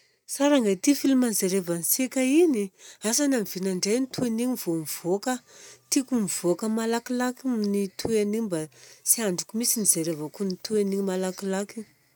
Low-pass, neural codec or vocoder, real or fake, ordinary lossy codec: none; none; real; none